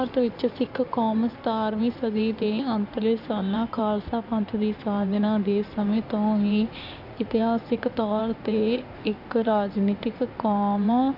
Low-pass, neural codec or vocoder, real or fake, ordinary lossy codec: 5.4 kHz; codec, 16 kHz in and 24 kHz out, 2.2 kbps, FireRedTTS-2 codec; fake; none